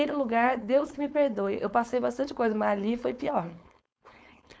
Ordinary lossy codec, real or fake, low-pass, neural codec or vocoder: none; fake; none; codec, 16 kHz, 4.8 kbps, FACodec